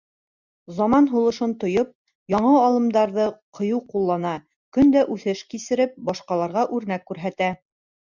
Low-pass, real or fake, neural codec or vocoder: 7.2 kHz; real; none